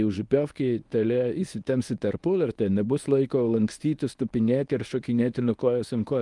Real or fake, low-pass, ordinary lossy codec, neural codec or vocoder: fake; 10.8 kHz; Opus, 32 kbps; codec, 24 kHz, 0.9 kbps, WavTokenizer, medium speech release version 1